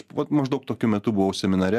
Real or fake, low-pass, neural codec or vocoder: real; 14.4 kHz; none